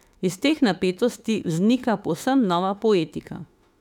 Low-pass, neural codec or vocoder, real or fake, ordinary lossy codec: 19.8 kHz; autoencoder, 48 kHz, 32 numbers a frame, DAC-VAE, trained on Japanese speech; fake; none